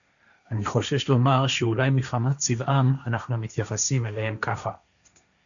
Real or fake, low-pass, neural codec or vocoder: fake; 7.2 kHz; codec, 16 kHz, 1.1 kbps, Voila-Tokenizer